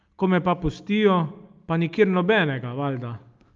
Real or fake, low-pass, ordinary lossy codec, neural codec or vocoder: real; 7.2 kHz; Opus, 32 kbps; none